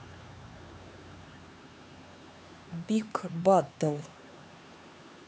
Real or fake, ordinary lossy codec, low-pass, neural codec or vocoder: fake; none; none; codec, 16 kHz, 4 kbps, X-Codec, HuBERT features, trained on LibriSpeech